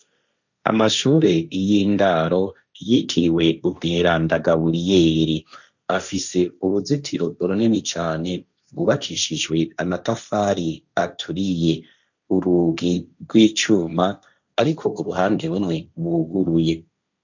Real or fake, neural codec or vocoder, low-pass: fake; codec, 16 kHz, 1.1 kbps, Voila-Tokenizer; 7.2 kHz